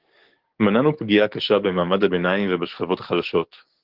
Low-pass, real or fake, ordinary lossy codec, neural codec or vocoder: 5.4 kHz; fake; Opus, 16 kbps; codec, 44.1 kHz, 7.8 kbps, DAC